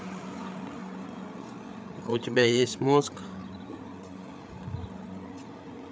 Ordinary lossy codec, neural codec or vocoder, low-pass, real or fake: none; codec, 16 kHz, 8 kbps, FreqCodec, larger model; none; fake